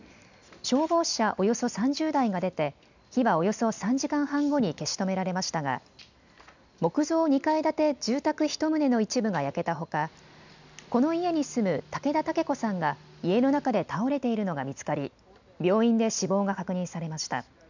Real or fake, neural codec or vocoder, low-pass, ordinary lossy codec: real; none; 7.2 kHz; none